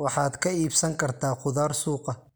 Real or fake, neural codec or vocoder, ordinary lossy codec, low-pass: real; none; none; none